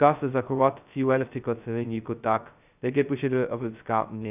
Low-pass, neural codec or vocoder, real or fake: 3.6 kHz; codec, 16 kHz, 0.2 kbps, FocalCodec; fake